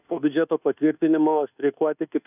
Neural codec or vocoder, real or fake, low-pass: codec, 24 kHz, 1.2 kbps, DualCodec; fake; 3.6 kHz